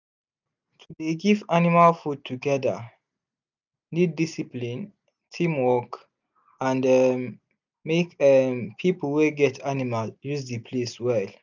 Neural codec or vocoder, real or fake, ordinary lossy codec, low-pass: none; real; none; 7.2 kHz